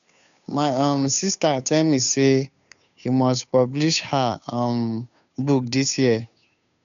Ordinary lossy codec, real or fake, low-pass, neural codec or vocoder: none; fake; 7.2 kHz; codec, 16 kHz, 2 kbps, FunCodec, trained on Chinese and English, 25 frames a second